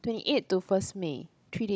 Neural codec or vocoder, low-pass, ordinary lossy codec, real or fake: codec, 16 kHz, 16 kbps, FunCodec, trained on Chinese and English, 50 frames a second; none; none; fake